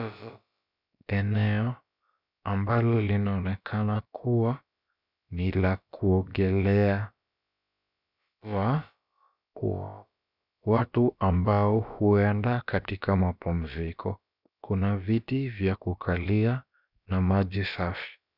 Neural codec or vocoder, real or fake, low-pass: codec, 16 kHz, about 1 kbps, DyCAST, with the encoder's durations; fake; 5.4 kHz